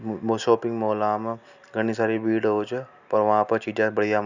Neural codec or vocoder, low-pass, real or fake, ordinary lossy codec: none; 7.2 kHz; real; none